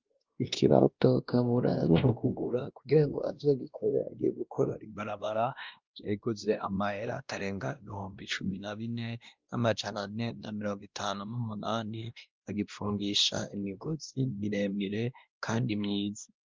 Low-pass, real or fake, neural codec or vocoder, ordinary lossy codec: 7.2 kHz; fake; codec, 16 kHz, 1 kbps, X-Codec, WavLM features, trained on Multilingual LibriSpeech; Opus, 24 kbps